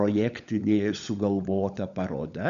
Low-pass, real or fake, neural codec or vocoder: 7.2 kHz; fake; codec, 16 kHz, 16 kbps, FunCodec, trained on LibriTTS, 50 frames a second